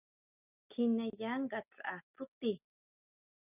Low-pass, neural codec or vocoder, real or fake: 3.6 kHz; none; real